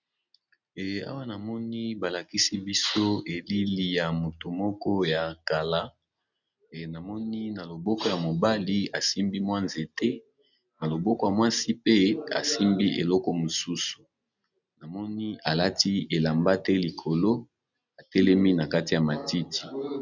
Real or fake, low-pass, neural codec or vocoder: real; 7.2 kHz; none